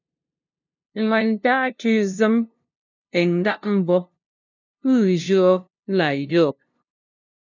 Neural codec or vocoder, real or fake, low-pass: codec, 16 kHz, 0.5 kbps, FunCodec, trained on LibriTTS, 25 frames a second; fake; 7.2 kHz